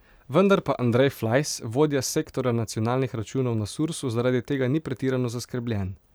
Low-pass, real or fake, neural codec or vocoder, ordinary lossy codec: none; fake; vocoder, 44.1 kHz, 128 mel bands every 512 samples, BigVGAN v2; none